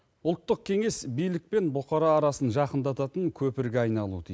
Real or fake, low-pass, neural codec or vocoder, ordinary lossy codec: real; none; none; none